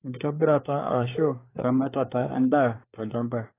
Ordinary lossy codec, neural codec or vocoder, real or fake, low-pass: AAC, 24 kbps; codec, 24 kHz, 1 kbps, SNAC; fake; 3.6 kHz